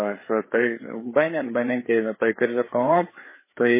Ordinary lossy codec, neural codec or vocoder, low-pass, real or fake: MP3, 16 kbps; codec, 16 kHz, 4 kbps, FreqCodec, larger model; 3.6 kHz; fake